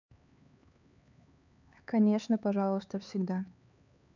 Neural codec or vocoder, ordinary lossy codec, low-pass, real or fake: codec, 16 kHz, 4 kbps, X-Codec, HuBERT features, trained on LibriSpeech; none; 7.2 kHz; fake